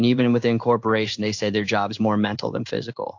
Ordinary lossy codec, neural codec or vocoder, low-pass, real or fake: AAC, 48 kbps; codec, 16 kHz in and 24 kHz out, 1 kbps, XY-Tokenizer; 7.2 kHz; fake